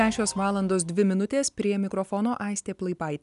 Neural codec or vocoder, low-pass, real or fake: none; 10.8 kHz; real